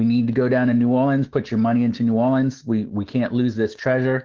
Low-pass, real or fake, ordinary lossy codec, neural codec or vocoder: 7.2 kHz; fake; Opus, 16 kbps; autoencoder, 48 kHz, 128 numbers a frame, DAC-VAE, trained on Japanese speech